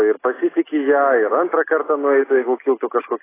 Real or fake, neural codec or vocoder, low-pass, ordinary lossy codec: real; none; 3.6 kHz; AAC, 16 kbps